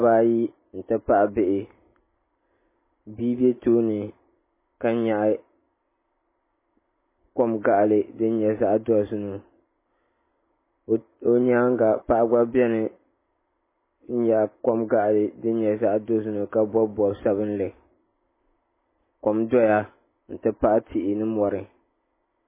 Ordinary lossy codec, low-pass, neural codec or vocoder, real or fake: MP3, 16 kbps; 3.6 kHz; none; real